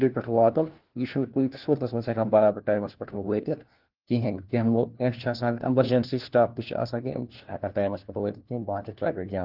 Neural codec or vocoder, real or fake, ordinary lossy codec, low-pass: codec, 16 kHz, 1 kbps, FunCodec, trained on LibriTTS, 50 frames a second; fake; Opus, 16 kbps; 5.4 kHz